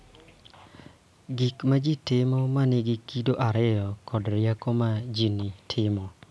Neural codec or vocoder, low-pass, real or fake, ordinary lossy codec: none; none; real; none